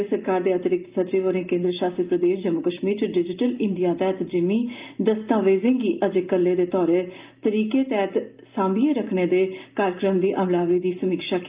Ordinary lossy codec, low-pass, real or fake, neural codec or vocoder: Opus, 32 kbps; 3.6 kHz; real; none